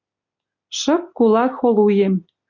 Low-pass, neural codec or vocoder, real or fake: 7.2 kHz; none; real